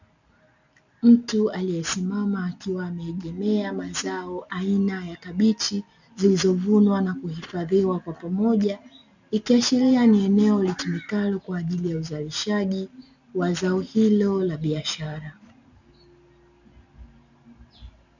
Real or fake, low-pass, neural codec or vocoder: real; 7.2 kHz; none